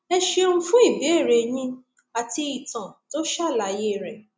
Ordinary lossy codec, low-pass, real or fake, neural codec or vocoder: none; none; real; none